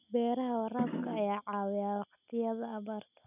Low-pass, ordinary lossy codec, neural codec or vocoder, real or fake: 3.6 kHz; none; none; real